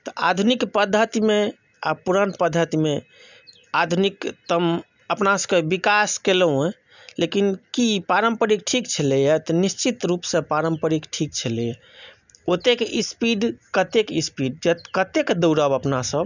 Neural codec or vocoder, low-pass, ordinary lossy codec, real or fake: none; 7.2 kHz; none; real